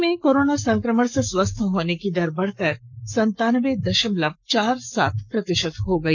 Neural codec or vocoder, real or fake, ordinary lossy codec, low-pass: codec, 44.1 kHz, 7.8 kbps, Pupu-Codec; fake; none; 7.2 kHz